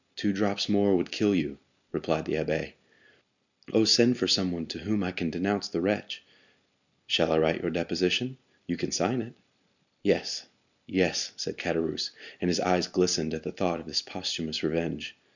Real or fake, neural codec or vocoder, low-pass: real; none; 7.2 kHz